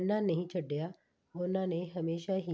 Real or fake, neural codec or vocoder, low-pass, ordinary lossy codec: real; none; none; none